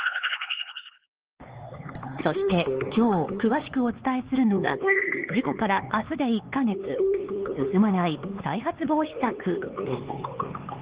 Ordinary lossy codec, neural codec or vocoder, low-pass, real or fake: Opus, 16 kbps; codec, 16 kHz, 4 kbps, X-Codec, HuBERT features, trained on LibriSpeech; 3.6 kHz; fake